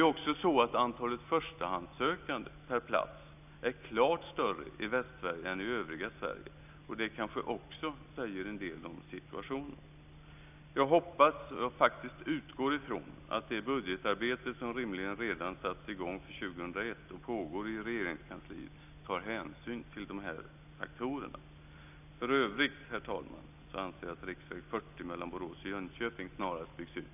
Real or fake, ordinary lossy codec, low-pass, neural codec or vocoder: real; none; 3.6 kHz; none